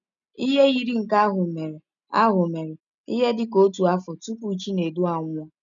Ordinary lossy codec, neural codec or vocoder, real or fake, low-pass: none; none; real; 7.2 kHz